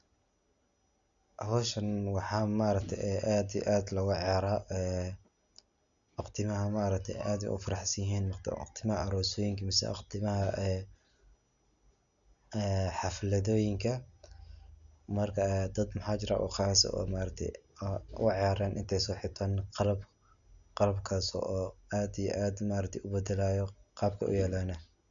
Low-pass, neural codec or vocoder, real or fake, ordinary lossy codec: 7.2 kHz; none; real; none